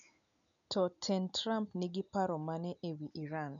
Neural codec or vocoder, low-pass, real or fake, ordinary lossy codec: none; 7.2 kHz; real; none